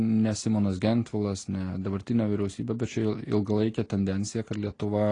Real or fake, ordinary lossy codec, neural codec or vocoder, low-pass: real; AAC, 32 kbps; none; 9.9 kHz